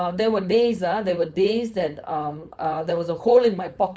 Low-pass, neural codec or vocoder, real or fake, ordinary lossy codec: none; codec, 16 kHz, 4.8 kbps, FACodec; fake; none